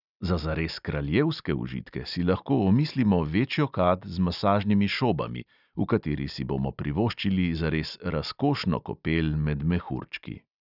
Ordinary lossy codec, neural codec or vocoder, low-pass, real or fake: none; none; 5.4 kHz; real